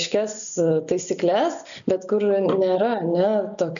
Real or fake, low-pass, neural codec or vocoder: real; 7.2 kHz; none